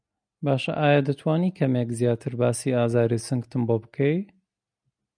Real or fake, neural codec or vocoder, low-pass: real; none; 9.9 kHz